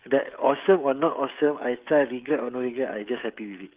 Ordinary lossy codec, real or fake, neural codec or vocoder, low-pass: Opus, 32 kbps; fake; codec, 16 kHz, 16 kbps, FreqCodec, smaller model; 3.6 kHz